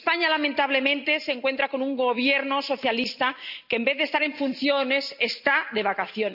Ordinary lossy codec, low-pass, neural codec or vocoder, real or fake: none; 5.4 kHz; none; real